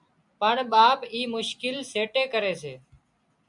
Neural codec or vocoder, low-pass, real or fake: none; 10.8 kHz; real